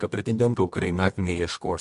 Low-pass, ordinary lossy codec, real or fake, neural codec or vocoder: 10.8 kHz; MP3, 64 kbps; fake; codec, 24 kHz, 0.9 kbps, WavTokenizer, medium music audio release